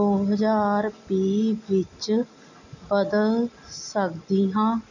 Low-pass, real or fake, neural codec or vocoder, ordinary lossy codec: 7.2 kHz; real; none; none